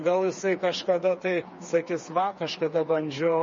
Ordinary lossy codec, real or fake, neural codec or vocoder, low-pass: MP3, 32 kbps; fake; codec, 16 kHz, 4 kbps, FreqCodec, smaller model; 7.2 kHz